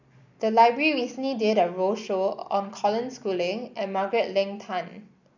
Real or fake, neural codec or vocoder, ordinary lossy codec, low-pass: real; none; none; 7.2 kHz